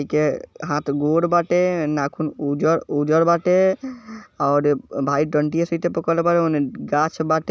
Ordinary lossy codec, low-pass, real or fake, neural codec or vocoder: none; none; real; none